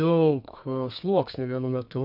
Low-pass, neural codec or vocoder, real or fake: 5.4 kHz; codec, 32 kHz, 1.9 kbps, SNAC; fake